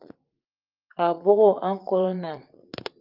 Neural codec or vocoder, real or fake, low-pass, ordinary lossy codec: vocoder, 44.1 kHz, 80 mel bands, Vocos; fake; 5.4 kHz; Opus, 24 kbps